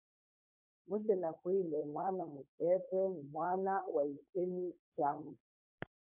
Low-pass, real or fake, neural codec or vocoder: 3.6 kHz; fake; codec, 16 kHz, 4.8 kbps, FACodec